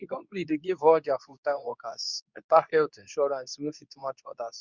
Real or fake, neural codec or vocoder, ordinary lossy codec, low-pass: fake; codec, 24 kHz, 0.9 kbps, WavTokenizer, medium speech release version 2; none; 7.2 kHz